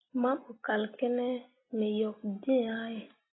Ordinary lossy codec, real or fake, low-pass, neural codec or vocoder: AAC, 16 kbps; real; 7.2 kHz; none